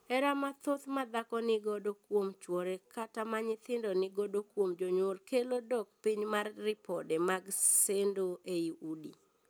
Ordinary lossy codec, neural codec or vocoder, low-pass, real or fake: none; none; none; real